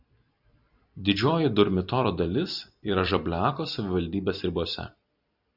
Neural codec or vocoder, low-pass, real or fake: vocoder, 44.1 kHz, 128 mel bands every 256 samples, BigVGAN v2; 5.4 kHz; fake